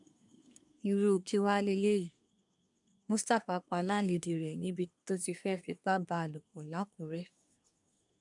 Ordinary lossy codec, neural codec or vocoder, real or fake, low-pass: none; codec, 24 kHz, 1 kbps, SNAC; fake; 10.8 kHz